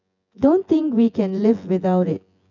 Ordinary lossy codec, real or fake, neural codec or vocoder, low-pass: none; fake; vocoder, 24 kHz, 100 mel bands, Vocos; 7.2 kHz